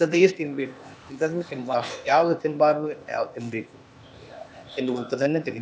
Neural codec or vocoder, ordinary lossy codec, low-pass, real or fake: codec, 16 kHz, 0.8 kbps, ZipCodec; none; none; fake